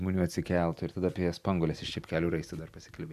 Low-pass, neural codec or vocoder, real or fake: 14.4 kHz; none; real